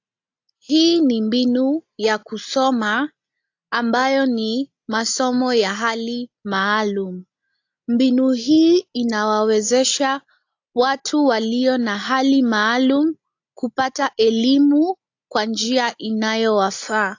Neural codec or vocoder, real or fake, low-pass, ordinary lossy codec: none; real; 7.2 kHz; AAC, 48 kbps